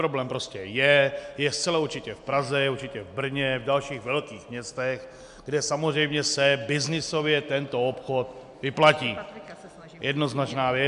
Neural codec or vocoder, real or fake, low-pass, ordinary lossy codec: none; real; 10.8 kHz; MP3, 96 kbps